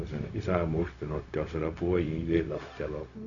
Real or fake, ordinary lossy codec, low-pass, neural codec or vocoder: fake; none; 7.2 kHz; codec, 16 kHz, 0.4 kbps, LongCat-Audio-Codec